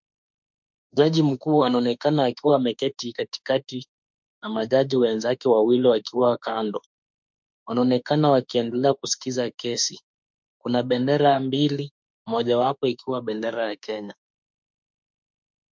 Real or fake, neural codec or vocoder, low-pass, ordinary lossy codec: fake; autoencoder, 48 kHz, 32 numbers a frame, DAC-VAE, trained on Japanese speech; 7.2 kHz; MP3, 64 kbps